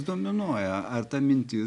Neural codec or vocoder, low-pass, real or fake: none; 10.8 kHz; real